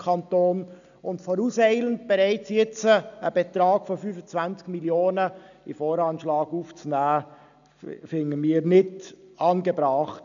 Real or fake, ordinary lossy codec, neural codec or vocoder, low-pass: real; none; none; 7.2 kHz